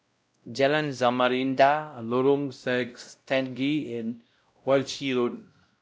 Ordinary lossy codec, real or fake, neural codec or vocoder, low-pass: none; fake; codec, 16 kHz, 0.5 kbps, X-Codec, WavLM features, trained on Multilingual LibriSpeech; none